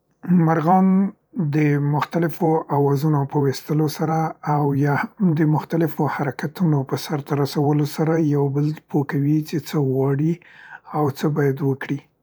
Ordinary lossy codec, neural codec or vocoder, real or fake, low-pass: none; vocoder, 44.1 kHz, 128 mel bands every 512 samples, BigVGAN v2; fake; none